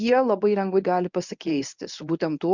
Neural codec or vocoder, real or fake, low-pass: codec, 24 kHz, 0.9 kbps, WavTokenizer, medium speech release version 1; fake; 7.2 kHz